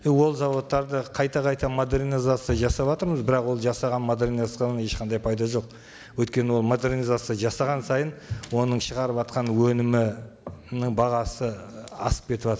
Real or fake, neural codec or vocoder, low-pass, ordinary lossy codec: real; none; none; none